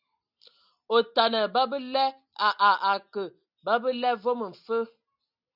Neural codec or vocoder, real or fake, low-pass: none; real; 5.4 kHz